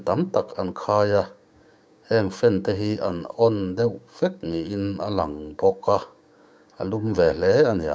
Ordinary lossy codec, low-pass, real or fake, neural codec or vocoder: none; none; fake; codec, 16 kHz, 6 kbps, DAC